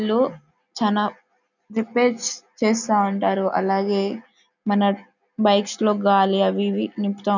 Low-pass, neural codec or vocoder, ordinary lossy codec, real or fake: 7.2 kHz; none; none; real